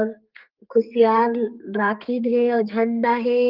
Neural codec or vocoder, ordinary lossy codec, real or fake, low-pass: codec, 32 kHz, 1.9 kbps, SNAC; Opus, 32 kbps; fake; 5.4 kHz